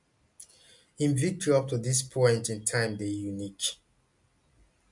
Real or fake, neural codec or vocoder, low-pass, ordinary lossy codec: real; none; 10.8 kHz; MP3, 64 kbps